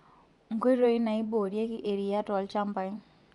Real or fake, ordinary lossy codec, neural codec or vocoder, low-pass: fake; none; vocoder, 24 kHz, 100 mel bands, Vocos; 10.8 kHz